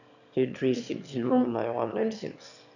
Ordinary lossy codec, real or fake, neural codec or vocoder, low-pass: none; fake; autoencoder, 22.05 kHz, a latent of 192 numbers a frame, VITS, trained on one speaker; 7.2 kHz